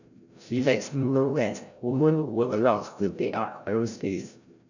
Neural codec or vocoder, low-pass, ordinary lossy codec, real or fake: codec, 16 kHz, 0.5 kbps, FreqCodec, larger model; 7.2 kHz; none; fake